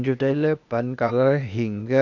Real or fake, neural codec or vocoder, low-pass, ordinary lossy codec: fake; codec, 16 kHz, 0.8 kbps, ZipCodec; 7.2 kHz; none